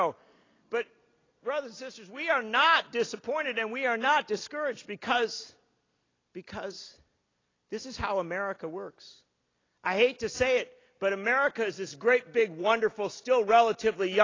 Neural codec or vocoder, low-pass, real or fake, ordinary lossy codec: none; 7.2 kHz; real; AAC, 32 kbps